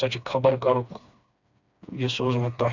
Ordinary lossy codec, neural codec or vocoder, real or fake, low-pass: none; codec, 16 kHz, 2 kbps, FreqCodec, smaller model; fake; 7.2 kHz